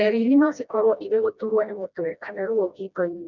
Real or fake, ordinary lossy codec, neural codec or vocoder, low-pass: fake; none; codec, 16 kHz, 1 kbps, FreqCodec, smaller model; 7.2 kHz